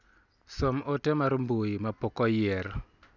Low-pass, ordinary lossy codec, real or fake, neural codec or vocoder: 7.2 kHz; none; real; none